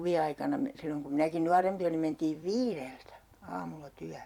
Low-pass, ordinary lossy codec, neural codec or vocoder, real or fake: 19.8 kHz; none; vocoder, 44.1 kHz, 128 mel bands every 256 samples, BigVGAN v2; fake